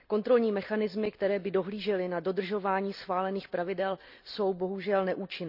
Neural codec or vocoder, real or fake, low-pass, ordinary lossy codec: none; real; 5.4 kHz; none